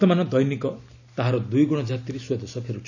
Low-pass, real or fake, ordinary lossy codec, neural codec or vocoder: 7.2 kHz; real; none; none